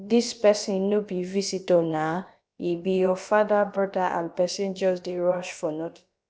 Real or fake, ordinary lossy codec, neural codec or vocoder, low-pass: fake; none; codec, 16 kHz, about 1 kbps, DyCAST, with the encoder's durations; none